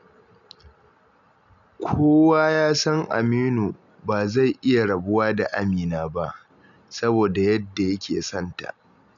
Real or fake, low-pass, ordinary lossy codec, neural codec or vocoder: real; 7.2 kHz; MP3, 96 kbps; none